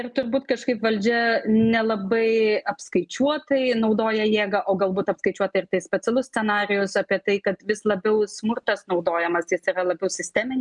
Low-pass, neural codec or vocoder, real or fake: 10.8 kHz; none; real